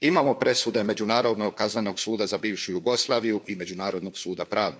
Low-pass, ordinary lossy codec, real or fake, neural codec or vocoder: none; none; fake; codec, 16 kHz, 4 kbps, FunCodec, trained on LibriTTS, 50 frames a second